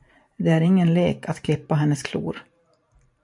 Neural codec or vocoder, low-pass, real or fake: vocoder, 44.1 kHz, 128 mel bands every 512 samples, BigVGAN v2; 10.8 kHz; fake